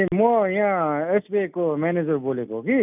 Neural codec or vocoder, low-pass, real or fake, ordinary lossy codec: none; 3.6 kHz; real; none